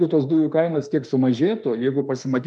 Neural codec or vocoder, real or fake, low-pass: autoencoder, 48 kHz, 32 numbers a frame, DAC-VAE, trained on Japanese speech; fake; 10.8 kHz